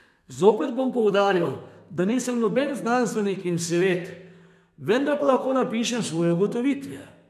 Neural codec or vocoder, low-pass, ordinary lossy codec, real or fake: codec, 32 kHz, 1.9 kbps, SNAC; 14.4 kHz; none; fake